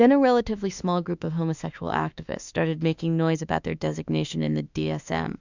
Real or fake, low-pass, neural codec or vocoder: fake; 7.2 kHz; autoencoder, 48 kHz, 32 numbers a frame, DAC-VAE, trained on Japanese speech